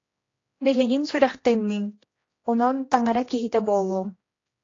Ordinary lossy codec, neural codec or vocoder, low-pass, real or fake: AAC, 32 kbps; codec, 16 kHz, 1 kbps, X-Codec, HuBERT features, trained on general audio; 7.2 kHz; fake